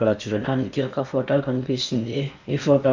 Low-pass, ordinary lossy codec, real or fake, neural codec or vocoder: 7.2 kHz; none; fake; codec, 16 kHz in and 24 kHz out, 0.8 kbps, FocalCodec, streaming, 65536 codes